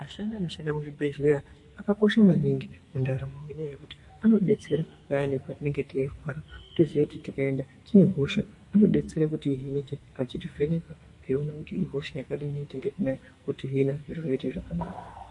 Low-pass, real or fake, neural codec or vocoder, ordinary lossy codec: 10.8 kHz; fake; codec, 44.1 kHz, 2.6 kbps, SNAC; MP3, 48 kbps